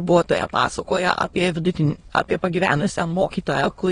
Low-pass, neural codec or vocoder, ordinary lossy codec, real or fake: 9.9 kHz; autoencoder, 22.05 kHz, a latent of 192 numbers a frame, VITS, trained on many speakers; AAC, 32 kbps; fake